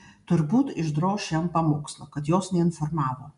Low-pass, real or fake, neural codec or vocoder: 10.8 kHz; real; none